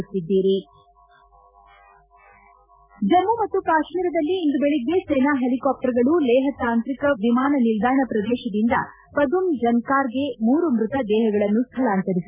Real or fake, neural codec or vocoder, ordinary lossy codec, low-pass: real; none; none; 3.6 kHz